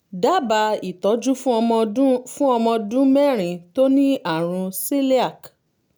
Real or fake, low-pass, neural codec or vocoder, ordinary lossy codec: real; none; none; none